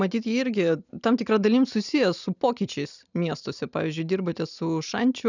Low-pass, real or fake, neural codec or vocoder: 7.2 kHz; real; none